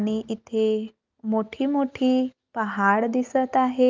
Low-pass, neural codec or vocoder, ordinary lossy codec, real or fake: 7.2 kHz; none; Opus, 32 kbps; real